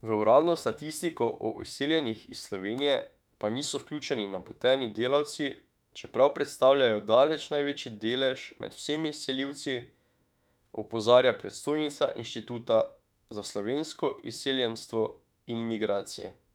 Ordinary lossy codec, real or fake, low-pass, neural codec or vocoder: none; fake; 19.8 kHz; autoencoder, 48 kHz, 32 numbers a frame, DAC-VAE, trained on Japanese speech